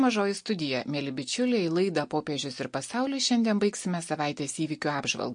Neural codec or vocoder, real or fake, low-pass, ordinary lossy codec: none; real; 9.9 kHz; MP3, 48 kbps